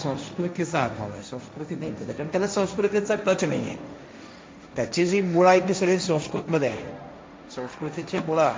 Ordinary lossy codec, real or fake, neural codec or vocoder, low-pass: none; fake; codec, 16 kHz, 1.1 kbps, Voila-Tokenizer; none